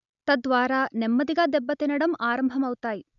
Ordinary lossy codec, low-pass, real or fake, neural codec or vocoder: none; 7.2 kHz; real; none